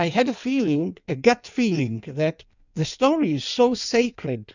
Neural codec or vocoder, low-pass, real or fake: codec, 16 kHz in and 24 kHz out, 1.1 kbps, FireRedTTS-2 codec; 7.2 kHz; fake